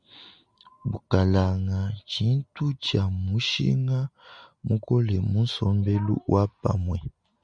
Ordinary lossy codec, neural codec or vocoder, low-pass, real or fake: MP3, 96 kbps; none; 9.9 kHz; real